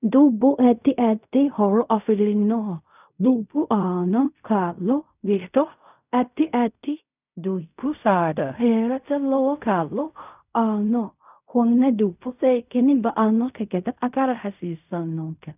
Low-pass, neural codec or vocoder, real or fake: 3.6 kHz; codec, 16 kHz in and 24 kHz out, 0.4 kbps, LongCat-Audio-Codec, fine tuned four codebook decoder; fake